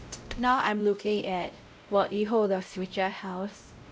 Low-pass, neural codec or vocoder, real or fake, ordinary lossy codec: none; codec, 16 kHz, 0.5 kbps, X-Codec, WavLM features, trained on Multilingual LibriSpeech; fake; none